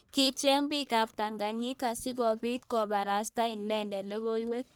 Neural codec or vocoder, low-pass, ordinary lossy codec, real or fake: codec, 44.1 kHz, 1.7 kbps, Pupu-Codec; none; none; fake